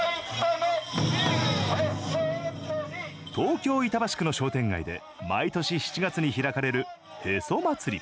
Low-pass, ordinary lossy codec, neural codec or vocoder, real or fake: none; none; none; real